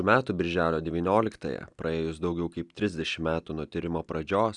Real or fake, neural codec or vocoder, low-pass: real; none; 10.8 kHz